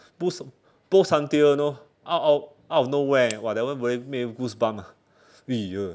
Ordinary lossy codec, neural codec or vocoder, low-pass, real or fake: none; none; none; real